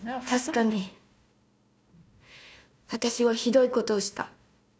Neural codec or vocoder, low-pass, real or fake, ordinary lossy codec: codec, 16 kHz, 1 kbps, FunCodec, trained on Chinese and English, 50 frames a second; none; fake; none